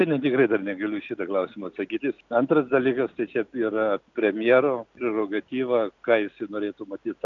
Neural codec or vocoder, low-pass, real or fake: none; 7.2 kHz; real